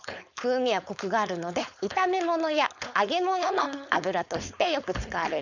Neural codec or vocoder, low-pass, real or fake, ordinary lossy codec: codec, 16 kHz, 4.8 kbps, FACodec; 7.2 kHz; fake; none